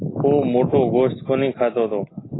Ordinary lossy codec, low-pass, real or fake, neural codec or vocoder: AAC, 16 kbps; 7.2 kHz; real; none